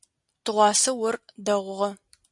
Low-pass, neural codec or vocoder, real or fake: 10.8 kHz; none; real